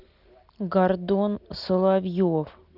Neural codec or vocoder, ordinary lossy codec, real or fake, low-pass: none; Opus, 32 kbps; real; 5.4 kHz